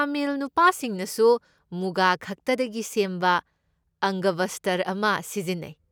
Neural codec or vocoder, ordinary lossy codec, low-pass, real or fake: none; none; none; real